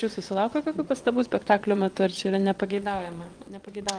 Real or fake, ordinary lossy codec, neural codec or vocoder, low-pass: fake; Opus, 32 kbps; vocoder, 22.05 kHz, 80 mel bands, WaveNeXt; 9.9 kHz